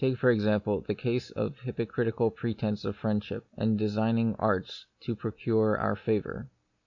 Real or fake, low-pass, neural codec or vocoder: real; 7.2 kHz; none